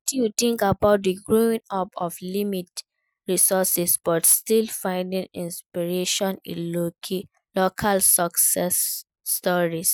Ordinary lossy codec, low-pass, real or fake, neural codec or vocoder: none; none; real; none